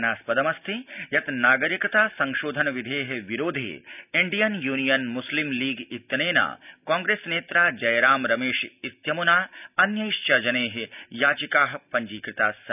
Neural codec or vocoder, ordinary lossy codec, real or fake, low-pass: none; none; real; 3.6 kHz